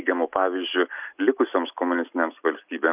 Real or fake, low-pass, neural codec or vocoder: real; 3.6 kHz; none